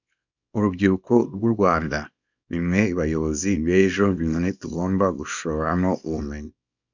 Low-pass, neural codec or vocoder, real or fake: 7.2 kHz; codec, 24 kHz, 0.9 kbps, WavTokenizer, small release; fake